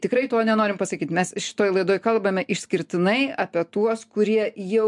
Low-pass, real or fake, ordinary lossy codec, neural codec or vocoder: 10.8 kHz; real; MP3, 64 kbps; none